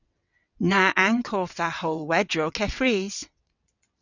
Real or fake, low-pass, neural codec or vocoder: fake; 7.2 kHz; vocoder, 22.05 kHz, 80 mel bands, WaveNeXt